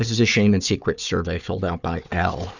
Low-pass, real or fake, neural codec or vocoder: 7.2 kHz; fake; codec, 16 kHz, 4 kbps, FunCodec, trained on Chinese and English, 50 frames a second